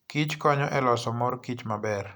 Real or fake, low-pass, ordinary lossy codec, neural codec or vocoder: real; none; none; none